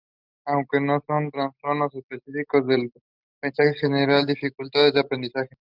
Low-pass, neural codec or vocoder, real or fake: 5.4 kHz; none; real